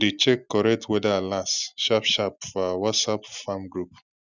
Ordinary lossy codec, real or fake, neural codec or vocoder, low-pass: none; real; none; 7.2 kHz